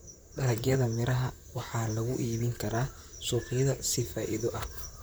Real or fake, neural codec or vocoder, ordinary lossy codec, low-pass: fake; vocoder, 44.1 kHz, 128 mel bands, Pupu-Vocoder; none; none